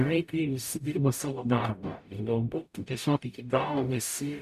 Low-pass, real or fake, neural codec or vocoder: 14.4 kHz; fake; codec, 44.1 kHz, 0.9 kbps, DAC